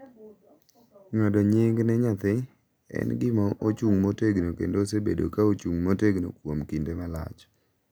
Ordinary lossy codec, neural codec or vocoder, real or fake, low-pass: none; none; real; none